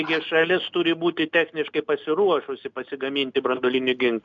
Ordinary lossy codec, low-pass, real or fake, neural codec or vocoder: MP3, 96 kbps; 7.2 kHz; real; none